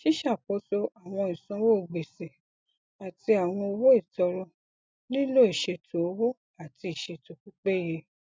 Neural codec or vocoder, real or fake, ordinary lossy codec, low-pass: none; real; none; none